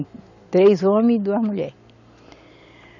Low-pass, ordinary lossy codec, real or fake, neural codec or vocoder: 7.2 kHz; none; real; none